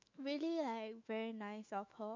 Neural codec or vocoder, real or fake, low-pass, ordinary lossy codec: autoencoder, 48 kHz, 128 numbers a frame, DAC-VAE, trained on Japanese speech; fake; 7.2 kHz; MP3, 48 kbps